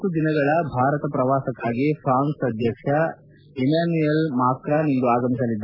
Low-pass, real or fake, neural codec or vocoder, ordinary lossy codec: 5.4 kHz; real; none; MP3, 48 kbps